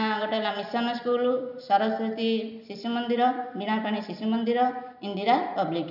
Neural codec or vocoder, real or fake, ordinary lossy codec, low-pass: none; real; none; 5.4 kHz